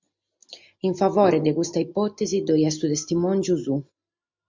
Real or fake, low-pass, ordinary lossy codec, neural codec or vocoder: real; 7.2 kHz; MP3, 64 kbps; none